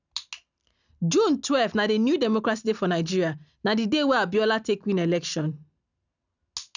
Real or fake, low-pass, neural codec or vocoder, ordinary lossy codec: real; 7.2 kHz; none; none